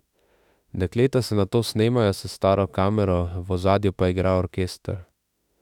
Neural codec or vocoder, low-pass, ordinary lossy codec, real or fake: autoencoder, 48 kHz, 32 numbers a frame, DAC-VAE, trained on Japanese speech; 19.8 kHz; none; fake